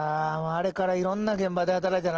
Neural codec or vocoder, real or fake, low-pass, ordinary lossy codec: none; real; 7.2 kHz; Opus, 16 kbps